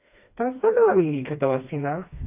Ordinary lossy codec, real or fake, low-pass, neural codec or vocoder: AAC, 32 kbps; fake; 3.6 kHz; codec, 16 kHz, 2 kbps, FreqCodec, smaller model